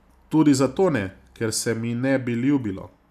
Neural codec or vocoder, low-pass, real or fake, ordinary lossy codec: none; 14.4 kHz; real; none